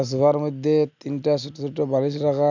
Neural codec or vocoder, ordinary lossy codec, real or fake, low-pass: none; none; real; 7.2 kHz